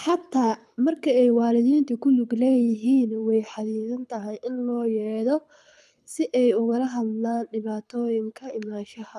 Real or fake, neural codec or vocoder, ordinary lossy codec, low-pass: fake; codec, 24 kHz, 6 kbps, HILCodec; none; none